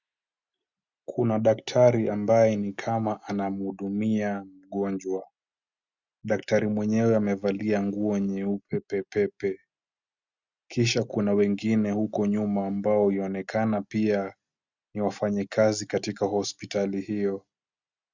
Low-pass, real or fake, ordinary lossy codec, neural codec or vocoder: 7.2 kHz; real; Opus, 64 kbps; none